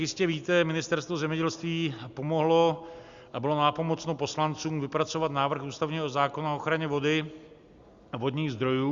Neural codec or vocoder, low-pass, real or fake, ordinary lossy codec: none; 7.2 kHz; real; Opus, 64 kbps